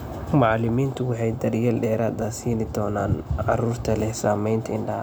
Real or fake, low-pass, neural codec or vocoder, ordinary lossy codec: real; none; none; none